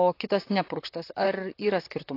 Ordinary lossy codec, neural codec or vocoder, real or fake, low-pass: AAC, 32 kbps; vocoder, 44.1 kHz, 128 mel bands, Pupu-Vocoder; fake; 5.4 kHz